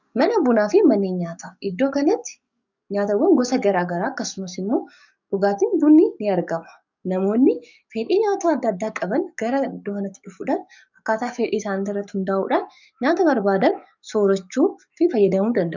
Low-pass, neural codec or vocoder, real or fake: 7.2 kHz; codec, 44.1 kHz, 7.8 kbps, DAC; fake